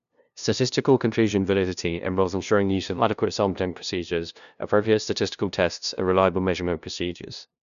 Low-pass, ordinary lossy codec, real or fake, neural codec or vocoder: 7.2 kHz; none; fake; codec, 16 kHz, 0.5 kbps, FunCodec, trained on LibriTTS, 25 frames a second